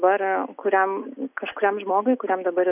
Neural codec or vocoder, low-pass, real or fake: none; 3.6 kHz; real